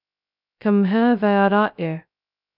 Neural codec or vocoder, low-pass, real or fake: codec, 16 kHz, 0.2 kbps, FocalCodec; 5.4 kHz; fake